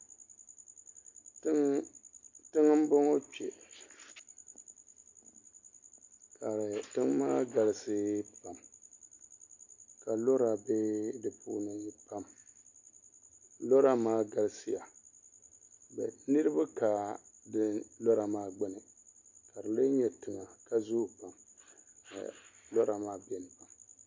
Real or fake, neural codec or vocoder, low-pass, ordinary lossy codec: real; none; 7.2 kHz; MP3, 48 kbps